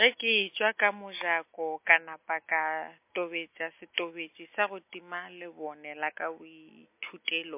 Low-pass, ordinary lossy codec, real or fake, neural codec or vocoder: 3.6 kHz; MP3, 24 kbps; real; none